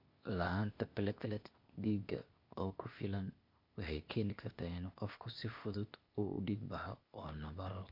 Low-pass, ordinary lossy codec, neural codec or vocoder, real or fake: 5.4 kHz; MP3, 48 kbps; codec, 16 kHz, 0.8 kbps, ZipCodec; fake